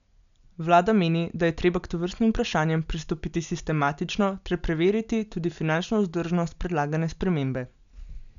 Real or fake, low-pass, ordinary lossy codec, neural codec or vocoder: real; 7.2 kHz; none; none